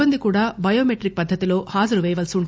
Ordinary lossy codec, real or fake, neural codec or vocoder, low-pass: none; real; none; none